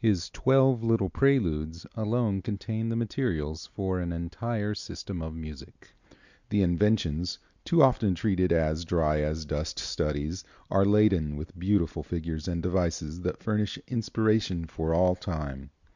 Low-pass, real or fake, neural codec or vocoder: 7.2 kHz; real; none